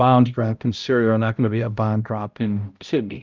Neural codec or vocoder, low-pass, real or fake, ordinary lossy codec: codec, 16 kHz, 0.5 kbps, X-Codec, HuBERT features, trained on balanced general audio; 7.2 kHz; fake; Opus, 24 kbps